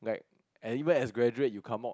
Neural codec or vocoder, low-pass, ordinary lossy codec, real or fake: none; none; none; real